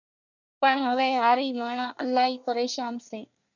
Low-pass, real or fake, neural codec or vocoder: 7.2 kHz; fake; codec, 24 kHz, 1 kbps, SNAC